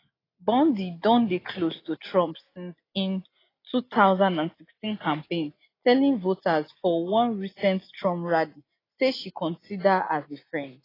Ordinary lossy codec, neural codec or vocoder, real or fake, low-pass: AAC, 24 kbps; none; real; 5.4 kHz